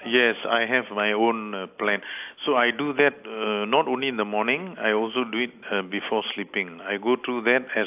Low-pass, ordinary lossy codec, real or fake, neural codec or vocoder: 3.6 kHz; AAC, 32 kbps; real; none